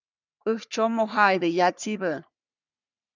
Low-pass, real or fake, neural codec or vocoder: 7.2 kHz; fake; codec, 44.1 kHz, 3.4 kbps, Pupu-Codec